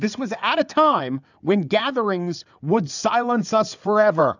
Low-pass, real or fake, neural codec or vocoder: 7.2 kHz; fake; codec, 16 kHz in and 24 kHz out, 2.2 kbps, FireRedTTS-2 codec